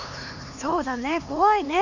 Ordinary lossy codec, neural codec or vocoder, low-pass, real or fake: none; codec, 16 kHz, 4 kbps, X-Codec, HuBERT features, trained on LibriSpeech; 7.2 kHz; fake